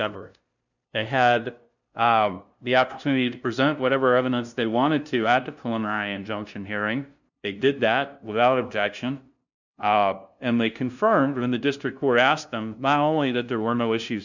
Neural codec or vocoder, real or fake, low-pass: codec, 16 kHz, 0.5 kbps, FunCodec, trained on LibriTTS, 25 frames a second; fake; 7.2 kHz